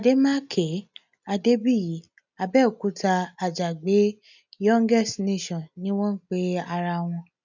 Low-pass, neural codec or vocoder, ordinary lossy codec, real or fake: 7.2 kHz; none; none; real